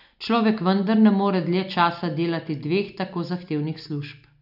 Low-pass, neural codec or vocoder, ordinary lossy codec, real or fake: 5.4 kHz; none; none; real